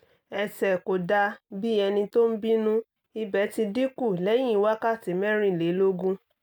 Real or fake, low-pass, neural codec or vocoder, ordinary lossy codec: real; none; none; none